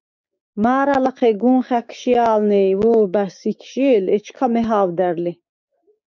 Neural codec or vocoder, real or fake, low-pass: codec, 16 kHz, 6 kbps, DAC; fake; 7.2 kHz